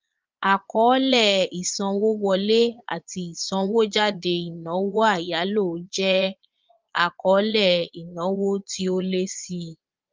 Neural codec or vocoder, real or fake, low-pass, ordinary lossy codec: vocoder, 22.05 kHz, 80 mel bands, Vocos; fake; 7.2 kHz; Opus, 24 kbps